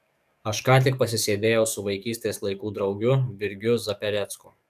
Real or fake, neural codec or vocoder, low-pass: fake; codec, 44.1 kHz, 7.8 kbps, DAC; 14.4 kHz